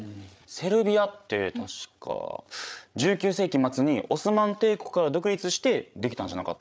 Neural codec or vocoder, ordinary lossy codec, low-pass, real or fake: codec, 16 kHz, 8 kbps, FreqCodec, larger model; none; none; fake